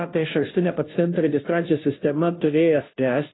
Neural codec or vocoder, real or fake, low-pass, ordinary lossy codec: codec, 16 kHz, 0.5 kbps, FunCodec, trained on Chinese and English, 25 frames a second; fake; 7.2 kHz; AAC, 16 kbps